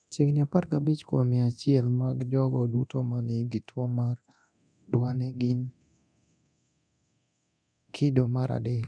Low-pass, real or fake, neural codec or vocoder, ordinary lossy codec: 9.9 kHz; fake; codec, 24 kHz, 0.9 kbps, DualCodec; none